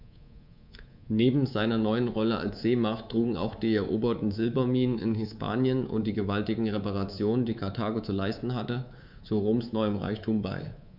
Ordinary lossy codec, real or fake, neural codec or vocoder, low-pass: none; fake; codec, 24 kHz, 3.1 kbps, DualCodec; 5.4 kHz